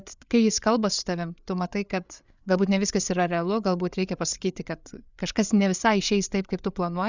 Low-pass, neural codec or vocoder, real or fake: 7.2 kHz; codec, 16 kHz, 8 kbps, FreqCodec, larger model; fake